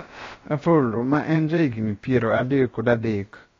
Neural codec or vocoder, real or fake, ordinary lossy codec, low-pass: codec, 16 kHz, about 1 kbps, DyCAST, with the encoder's durations; fake; AAC, 32 kbps; 7.2 kHz